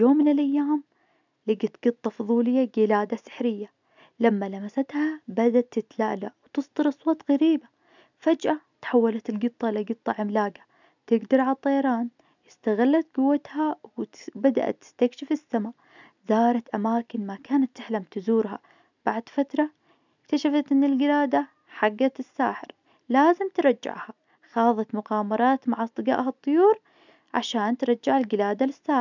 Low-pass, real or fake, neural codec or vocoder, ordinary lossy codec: 7.2 kHz; real; none; none